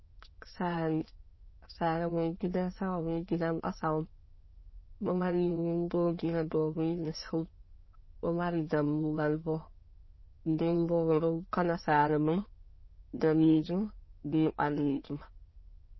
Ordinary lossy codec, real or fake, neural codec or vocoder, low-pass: MP3, 24 kbps; fake; autoencoder, 22.05 kHz, a latent of 192 numbers a frame, VITS, trained on many speakers; 7.2 kHz